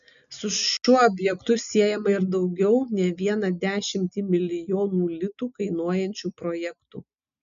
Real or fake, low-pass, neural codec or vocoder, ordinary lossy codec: real; 7.2 kHz; none; MP3, 96 kbps